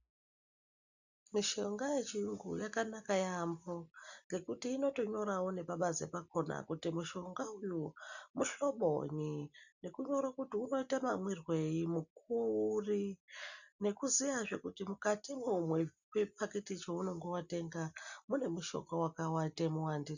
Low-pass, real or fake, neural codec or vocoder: 7.2 kHz; real; none